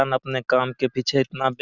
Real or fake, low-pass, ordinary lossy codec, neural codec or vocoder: real; 7.2 kHz; none; none